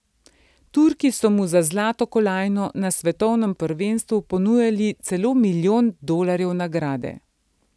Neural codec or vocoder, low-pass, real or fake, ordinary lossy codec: none; none; real; none